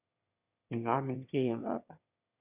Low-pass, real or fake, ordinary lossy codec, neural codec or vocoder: 3.6 kHz; fake; Opus, 64 kbps; autoencoder, 22.05 kHz, a latent of 192 numbers a frame, VITS, trained on one speaker